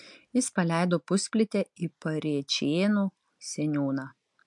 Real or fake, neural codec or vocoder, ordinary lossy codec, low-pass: real; none; MP3, 64 kbps; 10.8 kHz